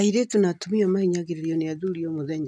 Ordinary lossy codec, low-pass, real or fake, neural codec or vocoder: none; none; real; none